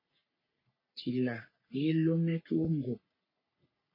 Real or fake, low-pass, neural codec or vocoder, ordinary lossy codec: fake; 5.4 kHz; codec, 44.1 kHz, 3.4 kbps, Pupu-Codec; MP3, 24 kbps